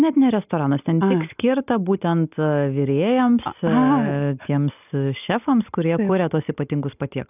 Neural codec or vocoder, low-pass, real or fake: none; 3.6 kHz; real